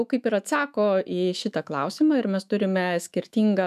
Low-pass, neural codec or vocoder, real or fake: 14.4 kHz; autoencoder, 48 kHz, 128 numbers a frame, DAC-VAE, trained on Japanese speech; fake